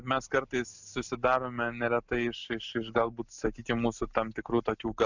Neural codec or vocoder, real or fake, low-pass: none; real; 7.2 kHz